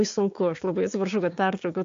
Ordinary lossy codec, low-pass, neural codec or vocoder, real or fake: AAC, 48 kbps; 7.2 kHz; codec, 16 kHz, 6 kbps, DAC; fake